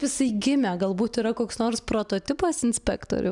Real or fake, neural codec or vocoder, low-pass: real; none; 10.8 kHz